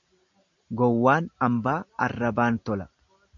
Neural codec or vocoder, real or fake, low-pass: none; real; 7.2 kHz